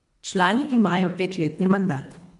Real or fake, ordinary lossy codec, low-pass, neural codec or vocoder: fake; MP3, 64 kbps; 10.8 kHz; codec, 24 kHz, 1.5 kbps, HILCodec